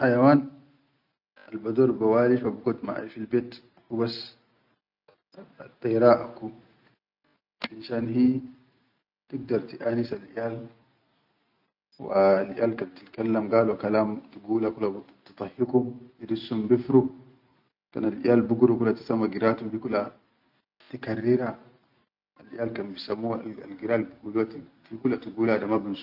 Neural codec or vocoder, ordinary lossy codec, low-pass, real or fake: none; none; 5.4 kHz; real